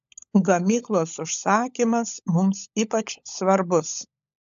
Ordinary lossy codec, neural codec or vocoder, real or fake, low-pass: AAC, 96 kbps; codec, 16 kHz, 16 kbps, FunCodec, trained on LibriTTS, 50 frames a second; fake; 7.2 kHz